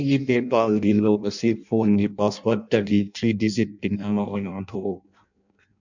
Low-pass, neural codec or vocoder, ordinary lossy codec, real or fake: 7.2 kHz; codec, 16 kHz in and 24 kHz out, 0.6 kbps, FireRedTTS-2 codec; none; fake